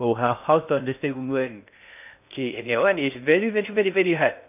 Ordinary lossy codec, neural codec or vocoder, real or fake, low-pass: none; codec, 16 kHz in and 24 kHz out, 0.6 kbps, FocalCodec, streaming, 2048 codes; fake; 3.6 kHz